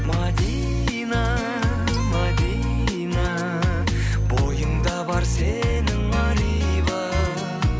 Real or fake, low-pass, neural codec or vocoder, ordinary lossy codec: real; none; none; none